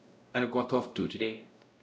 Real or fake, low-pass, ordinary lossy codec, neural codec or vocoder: fake; none; none; codec, 16 kHz, 0.5 kbps, X-Codec, WavLM features, trained on Multilingual LibriSpeech